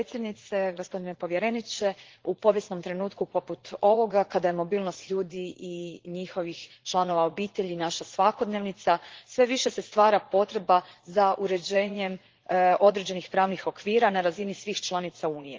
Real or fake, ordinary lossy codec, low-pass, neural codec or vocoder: fake; Opus, 16 kbps; 7.2 kHz; vocoder, 44.1 kHz, 80 mel bands, Vocos